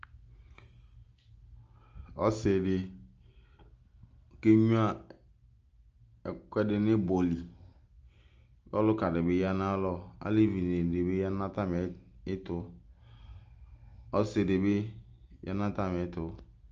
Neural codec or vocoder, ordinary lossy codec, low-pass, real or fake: none; Opus, 24 kbps; 7.2 kHz; real